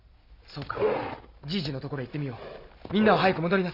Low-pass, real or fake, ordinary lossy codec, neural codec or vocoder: 5.4 kHz; real; Opus, 64 kbps; none